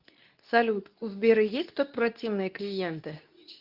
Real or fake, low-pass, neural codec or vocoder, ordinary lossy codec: fake; 5.4 kHz; codec, 24 kHz, 0.9 kbps, WavTokenizer, medium speech release version 2; Opus, 24 kbps